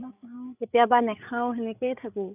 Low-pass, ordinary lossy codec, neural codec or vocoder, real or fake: 3.6 kHz; none; codec, 16 kHz, 8 kbps, FreqCodec, larger model; fake